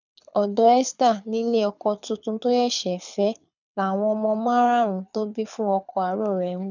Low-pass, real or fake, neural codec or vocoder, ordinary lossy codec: 7.2 kHz; fake; codec, 24 kHz, 6 kbps, HILCodec; none